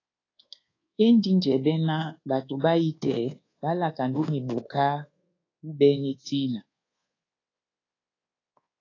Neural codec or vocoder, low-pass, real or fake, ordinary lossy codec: codec, 24 kHz, 1.2 kbps, DualCodec; 7.2 kHz; fake; AAC, 32 kbps